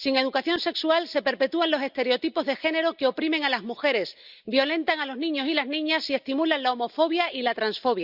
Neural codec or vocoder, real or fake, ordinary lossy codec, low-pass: none; real; Opus, 64 kbps; 5.4 kHz